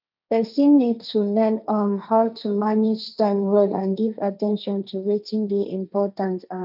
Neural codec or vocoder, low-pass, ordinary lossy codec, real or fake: codec, 16 kHz, 1.1 kbps, Voila-Tokenizer; 5.4 kHz; none; fake